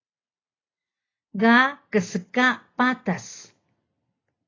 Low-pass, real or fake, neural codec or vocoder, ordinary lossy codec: 7.2 kHz; real; none; AAC, 32 kbps